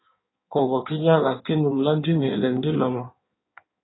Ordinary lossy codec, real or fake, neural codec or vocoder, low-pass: AAC, 16 kbps; fake; codec, 16 kHz in and 24 kHz out, 1.1 kbps, FireRedTTS-2 codec; 7.2 kHz